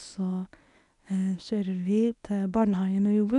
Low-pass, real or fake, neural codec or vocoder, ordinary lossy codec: 10.8 kHz; fake; codec, 24 kHz, 0.9 kbps, WavTokenizer, medium speech release version 1; none